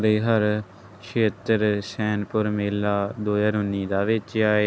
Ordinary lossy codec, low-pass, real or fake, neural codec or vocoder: none; none; real; none